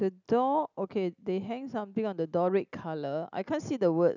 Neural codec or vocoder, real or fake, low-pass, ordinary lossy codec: none; real; 7.2 kHz; none